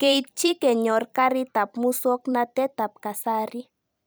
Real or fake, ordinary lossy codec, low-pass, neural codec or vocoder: fake; none; none; vocoder, 44.1 kHz, 128 mel bands every 256 samples, BigVGAN v2